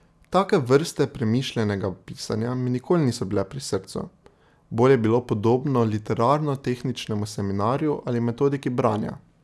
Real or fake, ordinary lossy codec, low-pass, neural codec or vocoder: real; none; none; none